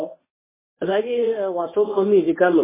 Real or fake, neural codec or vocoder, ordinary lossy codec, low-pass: fake; codec, 24 kHz, 0.9 kbps, WavTokenizer, medium speech release version 1; MP3, 16 kbps; 3.6 kHz